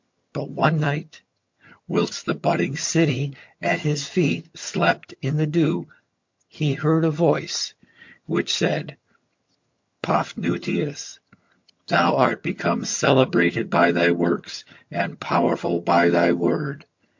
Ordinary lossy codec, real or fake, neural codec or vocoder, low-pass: MP3, 48 kbps; fake; vocoder, 22.05 kHz, 80 mel bands, HiFi-GAN; 7.2 kHz